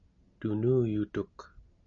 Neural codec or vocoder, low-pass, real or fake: none; 7.2 kHz; real